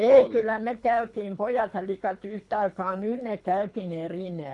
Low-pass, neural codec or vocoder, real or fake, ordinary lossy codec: 10.8 kHz; codec, 24 kHz, 3 kbps, HILCodec; fake; none